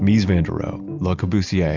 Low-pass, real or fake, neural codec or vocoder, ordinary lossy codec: 7.2 kHz; real; none; Opus, 64 kbps